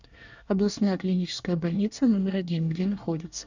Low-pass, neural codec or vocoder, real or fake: 7.2 kHz; codec, 24 kHz, 1 kbps, SNAC; fake